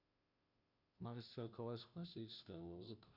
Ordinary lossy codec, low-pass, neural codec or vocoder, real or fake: none; 5.4 kHz; codec, 16 kHz, 0.5 kbps, FunCodec, trained on Chinese and English, 25 frames a second; fake